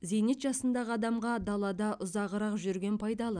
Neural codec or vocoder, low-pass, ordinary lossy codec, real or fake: none; 9.9 kHz; none; real